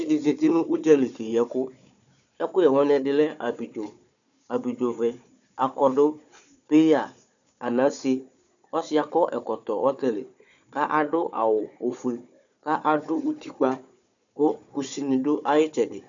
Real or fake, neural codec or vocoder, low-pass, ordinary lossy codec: fake; codec, 16 kHz, 4 kbps, FunCodec, trained on Chinese and English, 50 frames a second; 7.2 kHz; MP3, 96 kbps